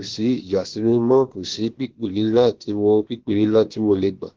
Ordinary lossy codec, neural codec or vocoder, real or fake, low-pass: Opus, 16 kbps; codec, 16 kHz, about 1 kbps, DyCAST, with the encoder's durations; fake; 7.2 kHz